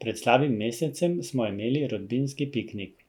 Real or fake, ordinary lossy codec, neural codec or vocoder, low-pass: real; none; none; 19.8 kHz